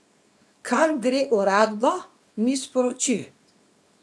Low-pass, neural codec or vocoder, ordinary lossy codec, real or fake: none; codec, 24 kHz, 0.9 kbps, WavTokenizer, small release; none; fake